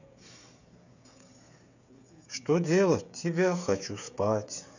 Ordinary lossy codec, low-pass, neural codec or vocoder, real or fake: none; 7.2 kHz; codec, 16 kHz, 8 kbps, FreqCodec, smaller model; fake